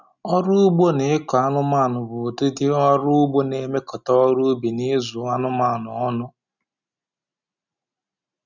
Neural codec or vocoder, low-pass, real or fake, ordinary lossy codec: none; 7.2 kHz; real; none